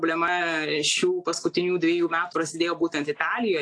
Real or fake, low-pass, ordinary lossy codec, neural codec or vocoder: real; 9.9 kHz; AAC, 48 kbps; none